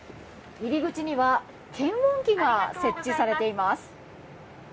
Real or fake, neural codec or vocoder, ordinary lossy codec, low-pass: real; none; none; none